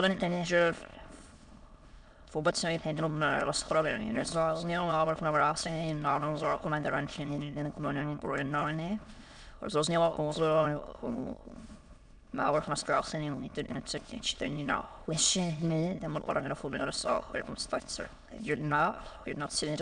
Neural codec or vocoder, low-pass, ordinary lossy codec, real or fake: autoencoder, 22.05 kHz, a latent of 192 numbers a frame, VITS, trained on many speakers; 9.9 kHz; MP3, 96 kbps; fake